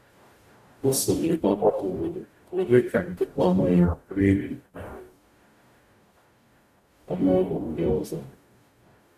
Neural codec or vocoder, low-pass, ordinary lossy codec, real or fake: codec, 44.1 kHz, 0.9 kbps, DAC; 14.4 kHz; AAC, 96 kbps; fake